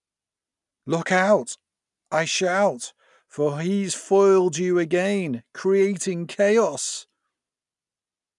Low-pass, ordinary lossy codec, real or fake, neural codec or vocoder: 10.8 kHz; none; real; none